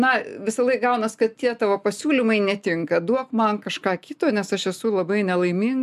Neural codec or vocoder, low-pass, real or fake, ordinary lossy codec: none; 14.4 kHz; real; MP3, 96 kbps